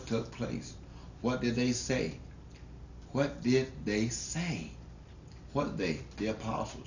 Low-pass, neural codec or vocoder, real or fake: 7.2 kHz; none; real